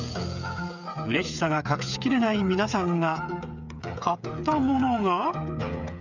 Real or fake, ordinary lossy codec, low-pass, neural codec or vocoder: fake; none; 7.2 kHz; codec, 16 kHz, 8 kbps, FreqCodec, smaller model